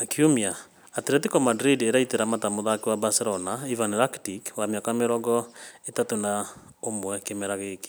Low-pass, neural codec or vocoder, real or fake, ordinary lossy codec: none; none; real; none